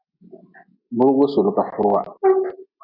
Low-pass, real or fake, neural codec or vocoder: 5.4 kHz; real; none